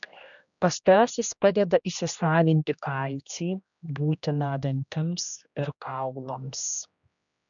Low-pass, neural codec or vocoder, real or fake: 7.2 kHz; codec, 16 kHz, 1 kbps, X-Codec, HuBERT features, trained on general audio; fake